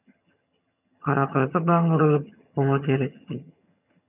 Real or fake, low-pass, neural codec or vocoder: fake; 3.6 kHz; vocoder, 22.05 kHz, 80 mel bands, HiFi-GAN